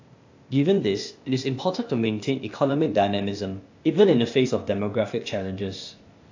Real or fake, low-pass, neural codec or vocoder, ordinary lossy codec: fake; 7.2 kHz; codec, 16 kHz, 0.8 kbps, ZipCodec; AAC, 48 kbps